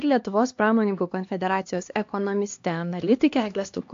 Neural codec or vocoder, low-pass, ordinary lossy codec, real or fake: codec, 16 kHz, 2 kbps, X-Codec, HuBERT features, trained on LibriSpeech; 7.2 kHz; AAC, 48 kbps; fake